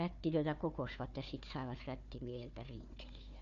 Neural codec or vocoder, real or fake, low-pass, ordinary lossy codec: codec, 16 kHz, 4 kbps, FunCodec, trained on Chinese and English, 50 frames a second; fake; 7.2 kHz; none